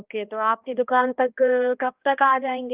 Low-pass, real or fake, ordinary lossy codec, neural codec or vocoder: 3.6 kHz; fake; Opus, 24 kbps; codec, 16 kHz, 1 kbps, X-Codec, HuBERT features, trained on balanced general audio